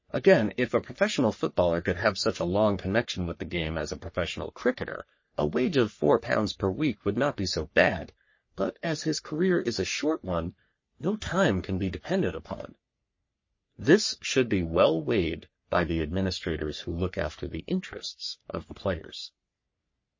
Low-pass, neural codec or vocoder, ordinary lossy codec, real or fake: 7.2 kHz; codec, 44.1 kHz, 3.4 kbps, Pupu-Codec; MP3, 32 kbps; fake